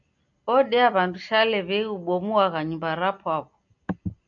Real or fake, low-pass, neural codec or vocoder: real; 7.2 kHz; none